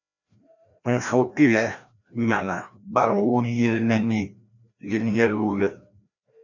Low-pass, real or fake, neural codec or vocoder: 7.2 kHz; fake; codec, 16 kHz, 1 kbps, FreqCodec, larger model